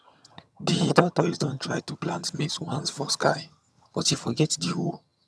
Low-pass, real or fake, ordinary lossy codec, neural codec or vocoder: none; fake; none; vocoder, 22.05 kHz, 80 mel bands, HiFi-GAN